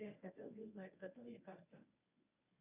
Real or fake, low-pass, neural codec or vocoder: fake; 3.6 kHz; codec, 24 kHz, 0.9 kbps, WavTokenizer, medium speech release version 1